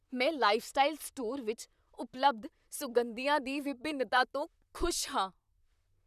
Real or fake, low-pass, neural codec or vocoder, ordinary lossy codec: fake; 14.4 kHz; vocoder, 44.1 kHz, 128 mel bands, Pupu-Vocoder; none